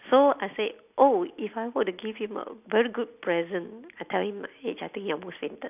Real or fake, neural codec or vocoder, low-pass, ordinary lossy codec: real; none; 3.6 kHz; none